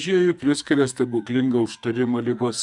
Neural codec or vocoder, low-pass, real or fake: codec, 44.1 kHz, 2.6 kbps, SNAC; 10.8 kHz; fake